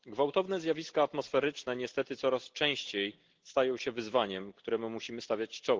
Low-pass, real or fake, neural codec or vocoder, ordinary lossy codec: 7.2 kHz; real; none; Opus, 16 kbps